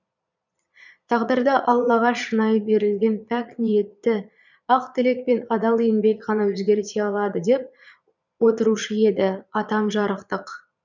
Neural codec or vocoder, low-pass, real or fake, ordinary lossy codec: vocoder, 22.05 kHz, 80 mel bands, Vocos; 7.2 kHz; fake; none